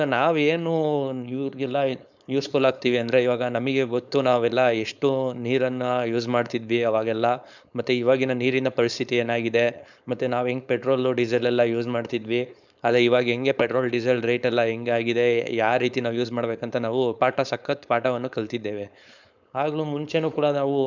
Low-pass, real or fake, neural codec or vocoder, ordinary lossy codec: 7.2 kHz; fake; codec, 16 kHz, 4.8 kbps, FACodec; none